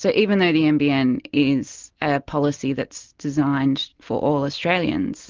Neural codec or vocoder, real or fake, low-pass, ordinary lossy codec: none; real; 7.2 kHz; Opus, 32 kbps